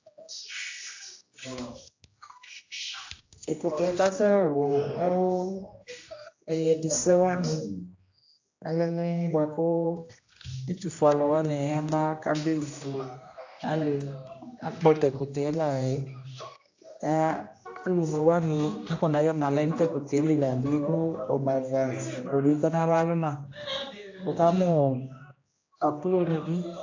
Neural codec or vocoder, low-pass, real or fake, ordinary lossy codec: codec, 16 kHz, 1 kbps, X-Codec, HuBERT features, trained on general audio; 7.2 kHz; fake; AAC, 48 kbps